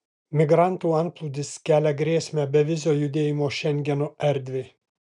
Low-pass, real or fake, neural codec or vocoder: 10.8 kHz; real; none